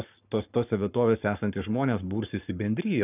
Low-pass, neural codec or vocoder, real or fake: 3.6 kHz; codec, 44.1 kHz, 7.8 kbps, Pupu-Codec; fake